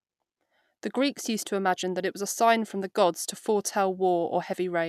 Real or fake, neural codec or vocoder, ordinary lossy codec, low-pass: real; none; none; 14.4 kHz